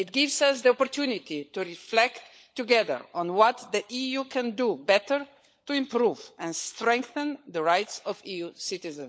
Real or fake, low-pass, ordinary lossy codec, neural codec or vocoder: fake; none; none; codec, 16 kHz, 16 kbps, FunCodec, trained on LibriTTS, 50 frames a second